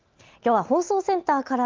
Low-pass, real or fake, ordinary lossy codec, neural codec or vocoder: 7.2 kHz; real; Opus, 32 kbps; none